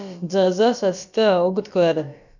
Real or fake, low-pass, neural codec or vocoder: fake; 7.2 kHz; codec, 16 kHz, about 1 kbps, DyCAST, with the encoder's durations